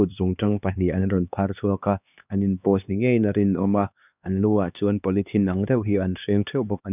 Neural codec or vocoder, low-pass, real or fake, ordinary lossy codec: codec, 16 kHz, 2 kbps, X-Codec, HuBERT features, trained on LibriSpeech; 3.6 kHz; fake; none